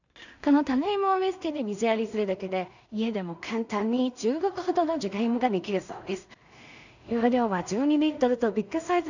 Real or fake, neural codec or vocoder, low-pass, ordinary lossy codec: fake; codec, 16 kHz in and 24 kHz out, 0.4 kbps, LongCat-Audio-Codec, two codebook decoder; 7.2 kHz; none